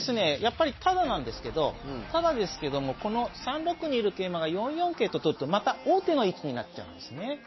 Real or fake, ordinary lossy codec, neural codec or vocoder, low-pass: real; MP3, 24 kbps; none; 7.2 kHz